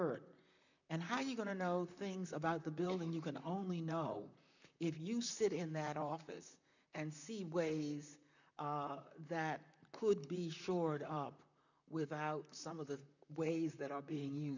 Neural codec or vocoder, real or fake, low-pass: vocoder, 44.1 kHz, 128 mel bands, Pupu-Vocoder; fake; 7.2 kHz